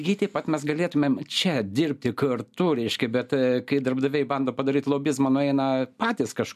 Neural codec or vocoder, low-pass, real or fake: none; 14.4 kHz; real